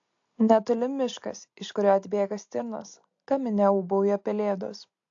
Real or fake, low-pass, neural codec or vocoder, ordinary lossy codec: real; 7.2 kHz; none; AAC, 48 kbps